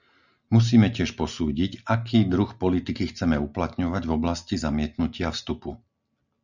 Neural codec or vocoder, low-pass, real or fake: none; 7.2 kHz; real